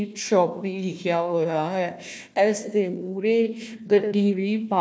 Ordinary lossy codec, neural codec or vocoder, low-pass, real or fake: none; codec, 16 kHz, 1 kbps, FunCodec, trained on Chinese and English, 50 frames a second; none; fake